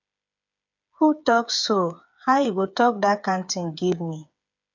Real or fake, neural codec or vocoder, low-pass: fake; codec, 16 kHz, 8 kbps, FreqCodec, smaller model; 7.2 kHz